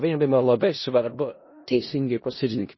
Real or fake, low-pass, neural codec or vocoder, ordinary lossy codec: fake; 7.2 kHz; codec, 16 kHz in and 24 kHz out, 0.4 kbps, LongCat-Audio-Codec, four codebook decoder; MP3, 24 kbps